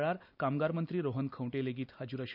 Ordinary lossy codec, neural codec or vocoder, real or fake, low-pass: none; none; real; 5.4 kHz